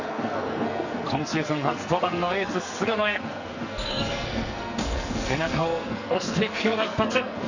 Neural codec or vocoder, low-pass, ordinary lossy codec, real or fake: codec, 44.1 kHz, 2.6 kbps, SNAC; 7.2 kHz; Opus, 64 kbps; fake